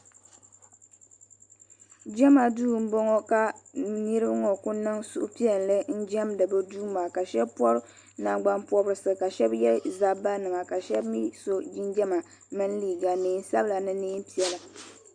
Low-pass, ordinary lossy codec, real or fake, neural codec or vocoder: 9.9 kHz; Opus, 64 kbps; real; none